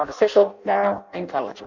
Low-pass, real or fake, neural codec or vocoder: 7.2 kHz; fake; codec, 16 kHz in and 24 kHz out, 0.6 kbps, FireRedTTS-2 codec